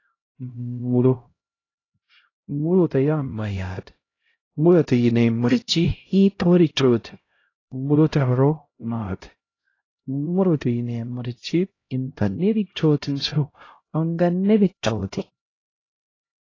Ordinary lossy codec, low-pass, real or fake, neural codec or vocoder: AAC, 32 kbps; 7.2 kHz; fake; codec, 16 kHz, 0.5 kbps, X-Codec, HuBERT features, trained on LibriSpeech